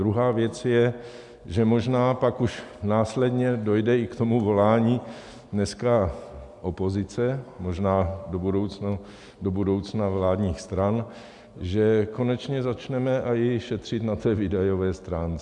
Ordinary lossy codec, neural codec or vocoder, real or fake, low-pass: MP3, 96 kbps; none; real; 10.8 kHz